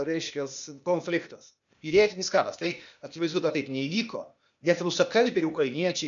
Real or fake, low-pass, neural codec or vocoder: fake; 7.2 kHz; codec, 16 kHz, 0.8 kbps, ZipCodec